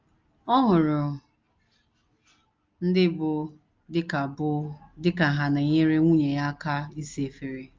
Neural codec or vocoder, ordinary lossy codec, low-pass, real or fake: none; Opus, 24 kbps; 7.2 kHz; real